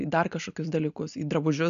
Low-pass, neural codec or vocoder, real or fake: 7.2 kHz; none; real